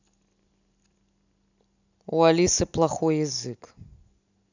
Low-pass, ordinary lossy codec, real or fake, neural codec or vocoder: 7.2 kHz; none; real; none